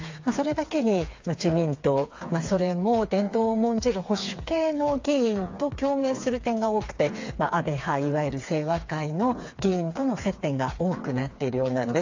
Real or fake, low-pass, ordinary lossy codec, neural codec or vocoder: fake; 7.2 kHz; AAC, 48 kbps; codec, 16 kHz, 4 kbps, FreqCodec, smaller model